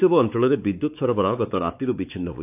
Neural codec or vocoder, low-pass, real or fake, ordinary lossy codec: codec, 16 kHz, 4 kbps, X-Codec, WavLM features, trained on Multilingual LibriSpeech; 3.6 kHz; fake; AAC, 32 kbps